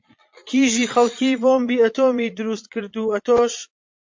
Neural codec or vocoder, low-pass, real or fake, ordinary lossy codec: vocoder, 22.05 kHz, 80 mel bands, Vocos; 7.2 kHz; fake; MP3, 48 kbps